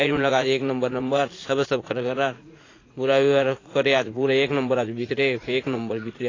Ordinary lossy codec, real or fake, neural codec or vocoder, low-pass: AAC, 32 kbps; fake; vocoder, 44.1 kHz, 80 mel bands, Vocos; 7.2 kHz